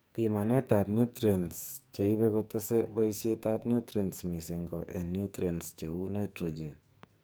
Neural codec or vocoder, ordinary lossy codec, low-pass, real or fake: codec, 44.1 kHz, 2.6 kbps, SNAC; none; none; fake